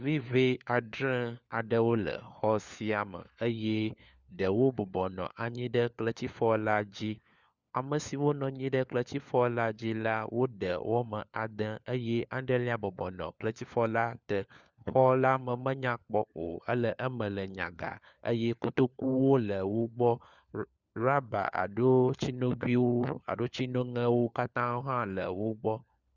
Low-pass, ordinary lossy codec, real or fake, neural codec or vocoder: 7.2 kHz; Opus, 64 kbps; fake; codec, 16 kHz, 4 kbps, FunCodec, trained on LibriTTS, 50 frames a second